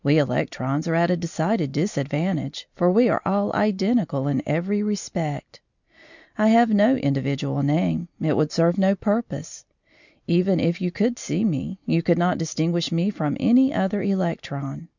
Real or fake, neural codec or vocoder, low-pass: real; none; 7.2 kHz